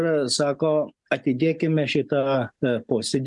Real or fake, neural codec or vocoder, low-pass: real; none; 10.8 kHz